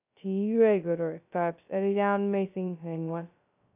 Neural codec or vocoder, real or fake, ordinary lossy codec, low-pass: codec, 16 kHz, 0.2 kbps, FocalCodec; fake; none; 3.6 kHz